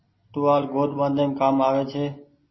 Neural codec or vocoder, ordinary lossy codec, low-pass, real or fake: none; MP3, 24 kbps; 7.2 kHz; real